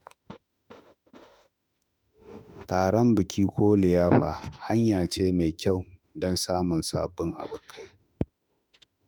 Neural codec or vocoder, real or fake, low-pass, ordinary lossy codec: autoencoder, 48 kHz, 32 numbers a frame, DAC-VAE, trained on Japanese speech; fake; none; none